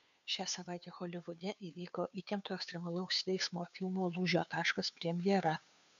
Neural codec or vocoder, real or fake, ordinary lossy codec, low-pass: codec, 16 kHz, 2 kbps, FunCodec, trained on Chinese and English, 25 frames a second; fake; MP3, 96 kbps; 7.2 kHz